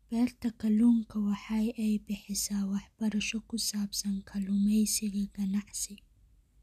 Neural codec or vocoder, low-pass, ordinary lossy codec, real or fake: none; 14.4 kHz; none; real